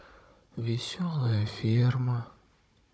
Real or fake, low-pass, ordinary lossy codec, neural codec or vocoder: fake; none; none; codec, 16 kHz, 16 kbps, FunCodec, trained on Chinese and English, 50 frames a second